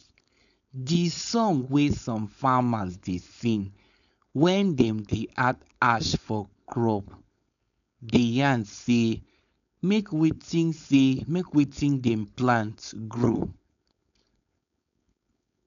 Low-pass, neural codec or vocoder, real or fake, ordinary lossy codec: 7.2 kHz; codec, 16 kHz, 4.8 kbps, FACodec; fake; none